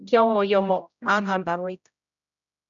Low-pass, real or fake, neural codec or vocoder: 7.2 kHz; fake; codec, 16 kHz, 0.5 kbps, X-Codec, HuBERT features, trained on general audio